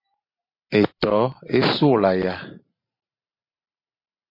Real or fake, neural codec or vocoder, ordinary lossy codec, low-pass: fake; vocoder, 44.1 kHz, 128 mel bands every 512 samples, BigVGAN v2; MP3, 32 kbps; 5.4 kHz